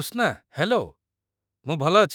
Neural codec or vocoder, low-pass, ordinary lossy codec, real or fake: autoencoder, 48 kHz, 32 numbers a frame, DAC-VAE, trained on Japanese speech; none; none; fake